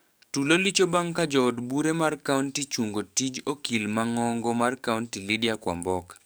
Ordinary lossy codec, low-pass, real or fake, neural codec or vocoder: none; none; fake; codec, 44.1 kHz, 7.8 kbps, DAC